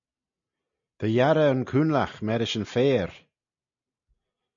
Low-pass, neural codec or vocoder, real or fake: 7.2 kHz; none; real